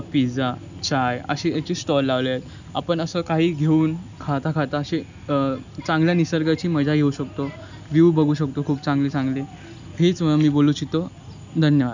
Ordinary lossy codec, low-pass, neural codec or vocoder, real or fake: none; 7.2 kHz; none; real